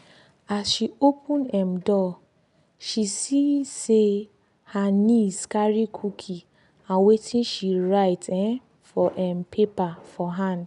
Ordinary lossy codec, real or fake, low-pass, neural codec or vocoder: none; real; 10.8 kHz; none